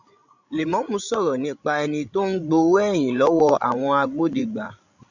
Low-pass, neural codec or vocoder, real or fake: 7.2 kHz; codec, 16 kHz, 16 kbps, FreqCodec, larger model; fake